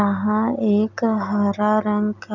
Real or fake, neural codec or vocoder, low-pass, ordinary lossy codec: real; none; 7.2 kHz; none